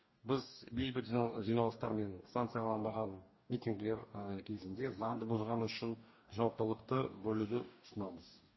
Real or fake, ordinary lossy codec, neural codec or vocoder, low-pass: fake; MP3, 24 kbps; codec, 44.1 kHz, 2.6 kbps, DAC; 7.2 kHz